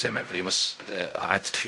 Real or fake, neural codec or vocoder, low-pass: fake; codec, 16 kHz in and 24 kHz out, 0.4 kbps, LongCat-Audio-Codec, fine tuned four codebook decoder; 10.8 kHz